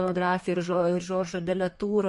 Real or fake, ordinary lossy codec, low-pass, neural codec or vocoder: fake; MP3, 48 kbps; 14.4 kHz; codec, 32 kHz, 1.9 kbps, SNAC